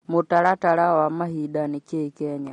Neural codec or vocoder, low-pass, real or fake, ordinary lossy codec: none; 19.8 kHz; real; MP3, 48 kbps